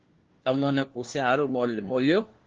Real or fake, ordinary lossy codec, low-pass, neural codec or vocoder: fake; Opus, 16 kbps; 7.2 kHz; codec, 16 kHz, 1 kbps, FunCodec, trained on LibriTTS, 50 frames a second